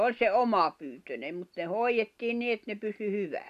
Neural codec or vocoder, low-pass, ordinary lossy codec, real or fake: none; 14.4 kHz; none; real